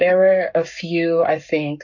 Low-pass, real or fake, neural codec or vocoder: 7.2 kHz; fake; codec, 44.1 kHz, 7.8 kbps, Pupu-Codec